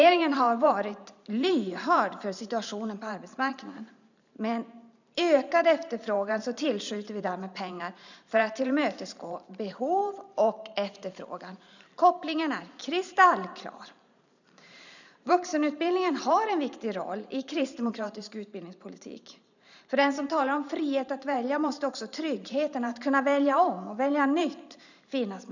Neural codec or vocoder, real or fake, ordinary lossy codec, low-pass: vocoder, 44.1 kHz, 128 mel bands every 512 samples, BigVGAN v2; fake; none; 7.2 kHz